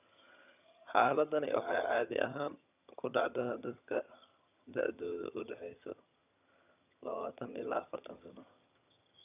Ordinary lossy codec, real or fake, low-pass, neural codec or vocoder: none; fake; 3.6 kHz; vocoder, 22.05 kHz, 80 mel bands, HiFi-GAN